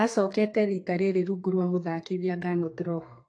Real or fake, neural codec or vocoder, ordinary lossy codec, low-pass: fake; codec, 32 kHz, 1.9 kbps, SNAC; AAC, 48 kbps; 9.9 kHz